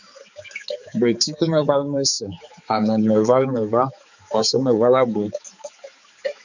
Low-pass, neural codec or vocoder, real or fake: 7.2 kHz; codec, 16 kHz, 4 kbps, X-Codec, HuBERT features, trained on balanced general audio; fake